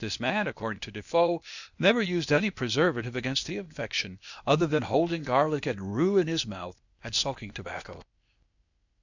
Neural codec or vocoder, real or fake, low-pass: codec, 16 kHz, 0.8 kbps, ZipCodec; fake; 7.2 kHz